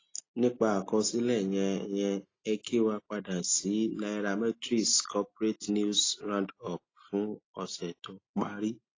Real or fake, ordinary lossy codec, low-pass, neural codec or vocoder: real; AAC, 32 kbps; 7.2 kHz; none